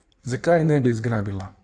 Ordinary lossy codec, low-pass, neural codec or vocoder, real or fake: AAC, 64 kbps; 9.9 kHz; codec, 16 kHz in and 24 kHz out, 1.1 kbps, FireRedTTS-2 codec; fake